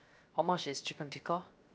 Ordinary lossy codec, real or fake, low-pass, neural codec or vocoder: none; fake; none; codec, 16 kHz, 0.7 kbps, FocalCodec